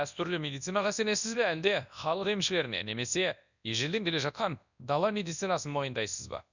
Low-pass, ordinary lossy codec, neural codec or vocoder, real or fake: 7.2 kHz; none; codec, 24 kHz, 0.9 kbps, WavTokenizer, large speech release; fake